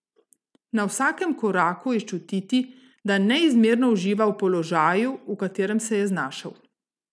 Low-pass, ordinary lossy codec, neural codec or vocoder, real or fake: none; none; none; real